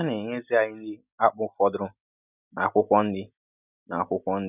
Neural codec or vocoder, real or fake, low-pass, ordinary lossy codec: none; real; 3.6 kHz; none